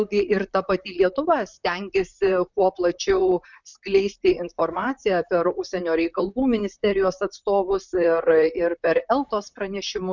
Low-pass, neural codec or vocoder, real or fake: 7.2 kHz; vocoder, 44.1 kHz, 128 mel bands, Pupu-Vocoder; fake